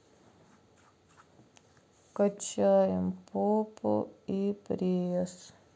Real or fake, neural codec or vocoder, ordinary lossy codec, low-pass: real; none; none; none